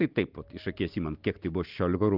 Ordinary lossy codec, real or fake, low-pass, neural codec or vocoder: Opus, 32 kbps; real; 5.4 kHz; none